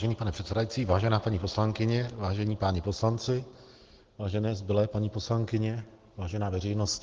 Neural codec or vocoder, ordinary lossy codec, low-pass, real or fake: none; Opus, 16 kbps; 7.2 kHz; real